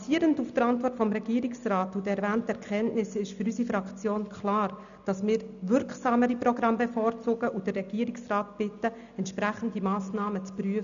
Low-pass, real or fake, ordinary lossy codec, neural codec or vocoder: 7.2 kHz; real; none; none